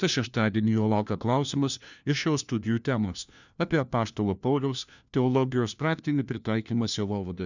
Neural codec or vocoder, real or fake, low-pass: codec, 16 kHz, 1 kbps, FunCodec, trained on LibriTTS, 50 frames a second; fake; 7.2 kHz